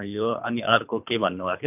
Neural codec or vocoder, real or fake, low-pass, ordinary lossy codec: codec, 24 kHz, 3 kbps, HILCodec; fake; 3.6 kHz; none